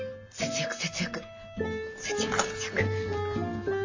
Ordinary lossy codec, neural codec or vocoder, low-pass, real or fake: AAC, 48 kbps; none; 7.2 kHz; real